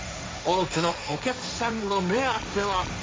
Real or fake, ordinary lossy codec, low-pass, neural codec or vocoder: fake; none; none; codec, 16 kHz, 1.1 kbps, Voila-Tokenizer